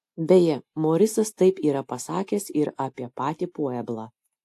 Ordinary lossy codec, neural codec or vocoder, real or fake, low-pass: AAC, 64 kbps; none; real; 14.4 kHz